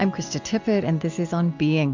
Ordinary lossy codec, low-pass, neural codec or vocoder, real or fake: MP3, 64 kbps; 7.2 kHz; none; real